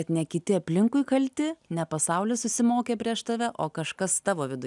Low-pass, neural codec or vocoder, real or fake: 10.8 kHz; none; real